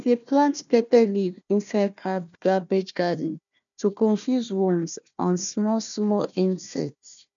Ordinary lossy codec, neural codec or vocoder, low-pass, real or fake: none; codec, 16 kHz, 1 kbps, FunCodec, trained on Chinese and English, 50 frames a second; 7.2 kHz; fake